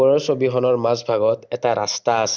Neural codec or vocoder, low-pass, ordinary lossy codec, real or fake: none; 7.2 kHz; none; real